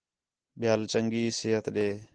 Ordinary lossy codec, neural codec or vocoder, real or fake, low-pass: Opus, 16 kbps; none; real; 9.9 kHz